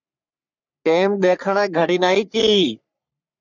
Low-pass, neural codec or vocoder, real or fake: 7.2 kHz; codec, 44.1 kHz, 3.4 kbps, Pupu-Codec; fake